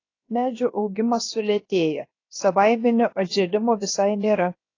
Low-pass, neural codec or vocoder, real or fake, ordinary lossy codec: 7.2 kHz; codec, 16 kHz, 0.7 kbps, FocalCodec; fake; AAC, 32 kbps